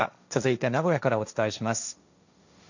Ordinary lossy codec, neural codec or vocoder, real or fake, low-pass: none; codec, 16 kHz, 1.1 kbps, Voila-Tokenizer; fake; 7.2 kHz